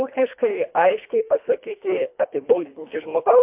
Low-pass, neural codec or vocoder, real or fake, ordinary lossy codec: 3.6 kHz; codec, 24 kHz, 1.5 kbps, HILCodec; fake; MP3, 32 kbps